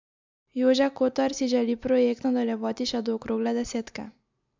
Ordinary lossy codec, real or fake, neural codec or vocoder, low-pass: MP3, 64 kbps; real; none; 7.2 kHz